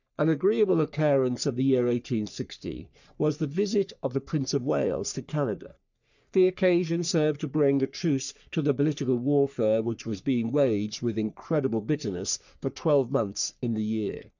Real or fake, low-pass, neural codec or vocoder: fake; 7.2 kHz; codec, 44.1 kHz, 3.4 kbps, Pupu-Codec